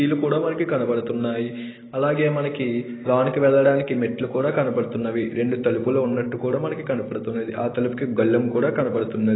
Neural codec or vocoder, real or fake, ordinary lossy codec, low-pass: none; real; AAC, 16 kbps; 7.2 kHz